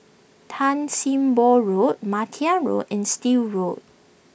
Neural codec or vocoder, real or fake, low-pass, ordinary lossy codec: none; real; none; none